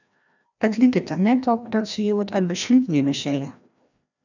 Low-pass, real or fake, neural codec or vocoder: 7.2 kHz; fake; codec, 16 kHz, 1 kbps, FreqCodec, larger model